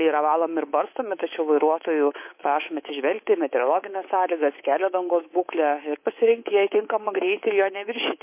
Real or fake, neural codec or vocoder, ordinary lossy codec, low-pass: fake; codec, 24 kHz, 3.1 kbps, DualCodec; MP3, 24 kbps; 3.6 kHz